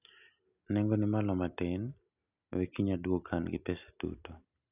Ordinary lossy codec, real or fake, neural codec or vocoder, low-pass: none; real; none; 3.6 kHz